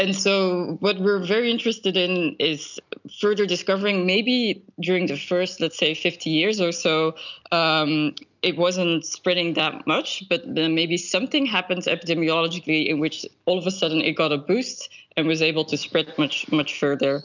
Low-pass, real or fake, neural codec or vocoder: 7.2 kHz; real; none